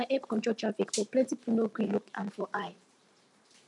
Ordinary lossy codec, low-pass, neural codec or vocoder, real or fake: none; 10.8 kHz; vocoder, 44.1 kHz, 128 mel bands every 512 samples, BigVGAN v2; fake